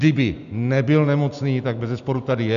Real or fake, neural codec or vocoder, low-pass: real; none; 7.2 kHz